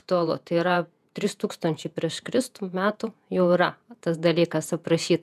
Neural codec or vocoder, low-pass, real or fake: vocoder, 48 kHz, 128 mel bands, Vocos; 14.4 kHz; fake